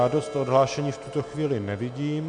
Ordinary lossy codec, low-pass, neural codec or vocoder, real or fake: MP3, 64 kbps; 9.9 kHz; none; real